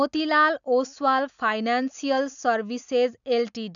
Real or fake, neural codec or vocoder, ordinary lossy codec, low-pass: real; none; none; 7.2 kHz